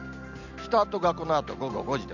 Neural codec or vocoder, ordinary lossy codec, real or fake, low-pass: none; none; real; 7.2 kHz